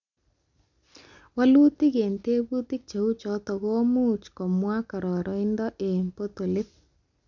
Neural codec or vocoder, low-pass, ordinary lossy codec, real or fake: none; 7.2 kHz; none; real